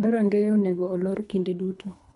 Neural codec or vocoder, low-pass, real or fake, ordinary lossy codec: codec, 24 kHz, 3 kbps, HILCodec; 10.8 kHz; fake; none